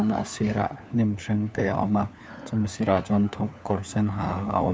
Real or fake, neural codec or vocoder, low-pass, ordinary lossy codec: fake; codec, 16 kHz, 4 kbps, FunCodec, trained on LibriTTS, 50 frames a second; none; none